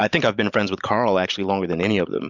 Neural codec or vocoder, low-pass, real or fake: none; 7.2 kHz; real